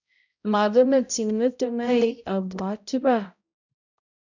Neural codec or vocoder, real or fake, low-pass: codec, 16 kHz, 0.5 kbps, X-Codec, HuBERT features, trained on balanced general audio; fake; 7.2 kHz